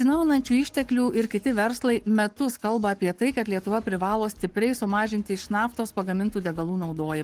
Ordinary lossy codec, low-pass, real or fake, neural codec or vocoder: Opus, 24 kbps; 14.4 kHz; fake; codec, 44.1 kHz, 7.8 kbps, Pupu-Codec